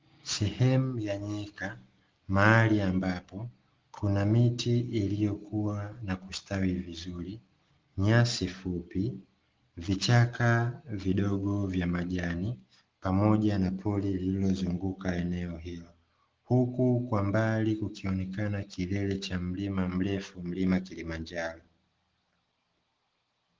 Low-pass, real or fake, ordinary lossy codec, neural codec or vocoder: 7.2 kHz; real; Opus, 16 kbps; none